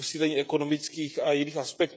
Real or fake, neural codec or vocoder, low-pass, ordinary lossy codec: fake; codec, 16 kHz, 16 kbps, FreqCodec, smaller model; none; none